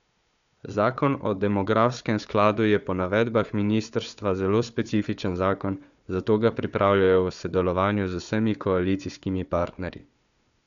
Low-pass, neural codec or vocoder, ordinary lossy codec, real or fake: 7.2 kHz; codec, 16 kHz, 4 kbps, FunCodec, trained on Chinese and English, 50 frames a second; MP3, 96 kbps; fake